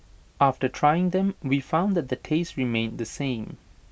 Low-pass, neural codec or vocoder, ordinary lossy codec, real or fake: none; none; none; real